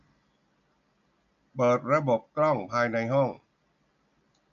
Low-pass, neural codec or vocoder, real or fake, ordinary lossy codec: 7.2 kHz; none; real; none